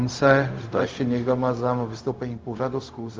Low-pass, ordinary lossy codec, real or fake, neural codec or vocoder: 7.2 kHz; Opus, 32 kbps; fake; codec, 16 kHz, 0.4 kbps, LongCat-Audio-Codec